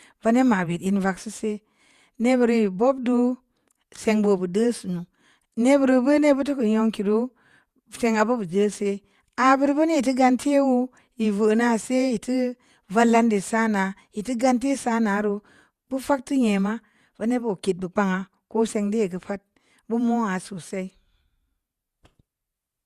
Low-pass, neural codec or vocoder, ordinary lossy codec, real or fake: 14.4 kHz; vocoder, 48 kHz, 128 mel bands, Vocos; Opus, 64 kbps; fake